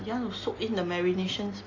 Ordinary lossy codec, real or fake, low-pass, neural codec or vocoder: AAC, 32 kbps; real; 7.2 kHz; none